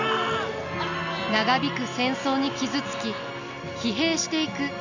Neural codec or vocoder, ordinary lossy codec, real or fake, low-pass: none; none; real; 7.2 kHz